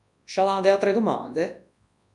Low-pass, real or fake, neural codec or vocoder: 10.8 kHz; fake; codec, 24 kHz, 0.9 kbps, WavTokenizer, large speech release